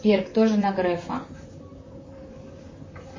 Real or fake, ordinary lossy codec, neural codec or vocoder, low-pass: fake; MP3, 32 kbps; vocoder, 44.1 kHz, 128 mel bands, Pupu-Vocoder; 7.2 kHz